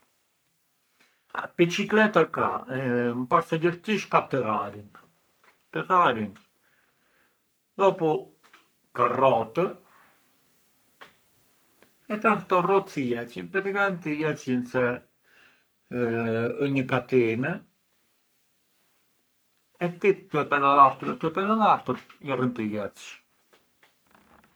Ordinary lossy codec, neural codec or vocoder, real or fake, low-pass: none; codec, 44.1 kHz, 3.4 kbps, Pupu-Codec; fake; none